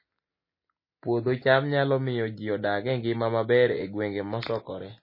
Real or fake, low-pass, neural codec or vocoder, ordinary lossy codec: real; 5.4 kHz; none; MP3, 24 kbps